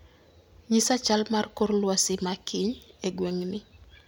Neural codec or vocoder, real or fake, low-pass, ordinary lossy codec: none; real; none; none